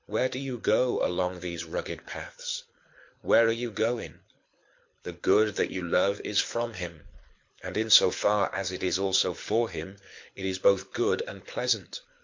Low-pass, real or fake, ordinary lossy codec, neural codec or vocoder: 7.2 kHz; fake; MP3, 48 kbps; codec, 24 kHz, 6 kbps, HILCodec